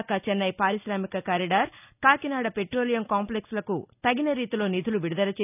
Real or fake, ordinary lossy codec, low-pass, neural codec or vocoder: real; none; 3.6 kHz; none